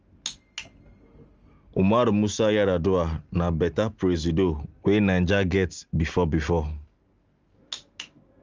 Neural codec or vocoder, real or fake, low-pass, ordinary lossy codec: none; real; 7.2 kHz; Opus, 24 kbps